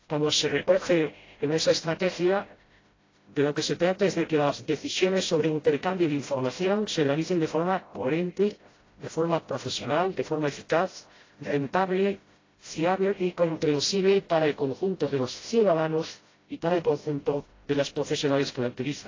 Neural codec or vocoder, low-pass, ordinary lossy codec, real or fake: codec, 16 kHz, 0.5 kbps, FreqCodec, smaller model; 7.2 kHz; AAC, 32 kbps; fake